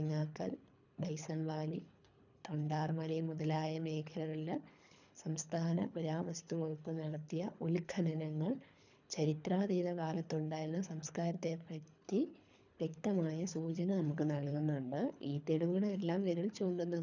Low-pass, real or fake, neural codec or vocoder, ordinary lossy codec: 7.2 kHz; fake; codec, 24 kHz, 3 kbps, HILCodec; none